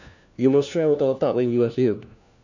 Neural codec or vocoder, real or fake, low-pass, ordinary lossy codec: codec, 16 kHz, 1 kbps, FunCodec, trained on LibriTTS, 50 frames a second; fake; 7.2 kHz; none